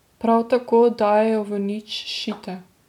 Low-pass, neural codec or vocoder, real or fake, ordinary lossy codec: 19.8 kHz; none; real; none